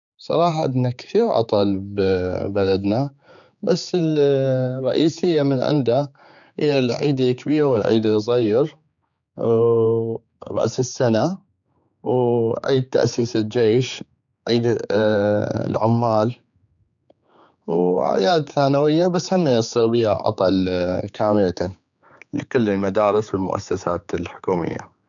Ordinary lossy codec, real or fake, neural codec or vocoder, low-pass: none; fake; codec, 16 kHz, 4 kbps, X-Codec, HuBERT features, trained on general audio; 7.2 kHz